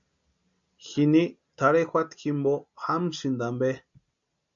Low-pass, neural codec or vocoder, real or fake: 7.2 kHz; none; real